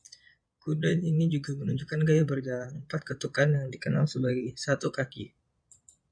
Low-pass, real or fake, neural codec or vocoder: 9.9 kHz; fake; vocoder, 22.05 kHz, 80 mel bands, Vocos